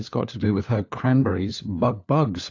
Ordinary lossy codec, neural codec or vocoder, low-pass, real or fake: AAC, 48 kbps; codec, 16 kHz, 4 kbps, FunCodec, trained on LibriTTS, 50 frames a second; 7.2 kHz; fake